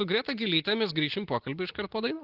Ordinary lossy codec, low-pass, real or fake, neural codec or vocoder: Opus, 16 kbps; 5.4 kHz; real; none